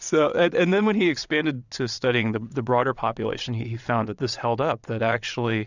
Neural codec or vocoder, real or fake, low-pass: vocoder, 22.05 kHz, 80 mel bands, WaveNeXt; fake; 7.2 kHz